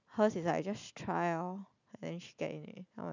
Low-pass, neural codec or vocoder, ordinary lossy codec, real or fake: 7.2 kHz; none; none; real